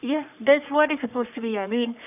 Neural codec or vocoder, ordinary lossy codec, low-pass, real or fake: codec, 44.1 kHz, 3.4 kbps, Pupu-Codec; none; 3.6 kHz; fake